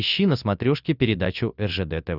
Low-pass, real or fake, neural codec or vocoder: 5.4 kHz; real; none